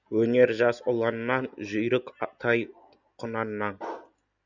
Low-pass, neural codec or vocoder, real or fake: 7.2 kHz; none; real